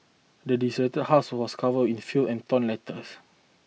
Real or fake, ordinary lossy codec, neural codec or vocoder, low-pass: real; none; none; none